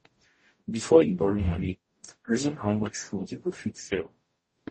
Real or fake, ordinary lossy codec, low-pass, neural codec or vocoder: fake; MP3, 32 kbps; 10.8 kHz; codec, 44.1 kHz, 0.9 kbps, DAC